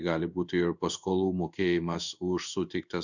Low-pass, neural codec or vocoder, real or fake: 7.2 kHz; codec, 16 kHz in and 24 kHz out, 1 kbps, XY-Tokenizer; fake